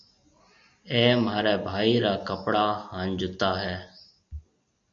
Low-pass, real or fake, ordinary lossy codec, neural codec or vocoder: 7.2 kHz; real; MP3, 48 kbps; none